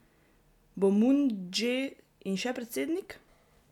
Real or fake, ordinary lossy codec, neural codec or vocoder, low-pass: real; none; none; 19.8 kHz